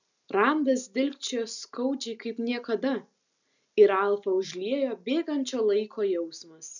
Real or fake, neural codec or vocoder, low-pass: real; none; 7.2 kHz